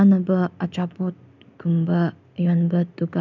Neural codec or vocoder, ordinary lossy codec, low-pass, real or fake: none; none; 7.2 kHz; real